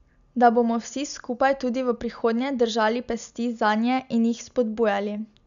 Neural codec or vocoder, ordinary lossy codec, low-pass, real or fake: none; none; 7.2 kHz; real